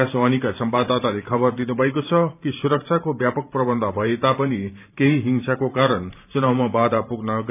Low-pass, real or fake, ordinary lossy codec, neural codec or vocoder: 3.6 kHz; fake; none; vocoder, 44.1 kHz, 128 mel bands every 512 samples, BigVGAN v2